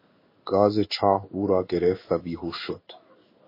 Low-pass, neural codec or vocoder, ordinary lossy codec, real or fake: 5.4 kHz; codec, 16 kHz in and 24 kHz out, 1 kbps, XY-Tokenizer; MP3, 24 kbps; fake